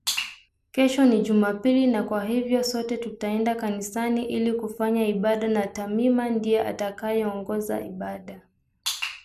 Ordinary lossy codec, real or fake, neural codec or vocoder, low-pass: none; real; none; 14.4 kHz